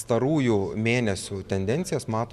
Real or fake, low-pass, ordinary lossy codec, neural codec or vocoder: real; 14.4 kHz; Opus, 64 kbps; none